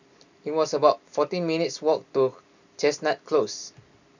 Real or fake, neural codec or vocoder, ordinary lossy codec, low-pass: real; none; none; 7.2 kHz